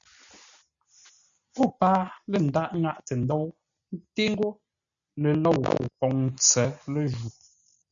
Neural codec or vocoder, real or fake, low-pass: none; real; 7.2 kHz